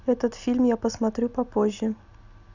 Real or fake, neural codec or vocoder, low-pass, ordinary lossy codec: real; none; 7.2 kHz; none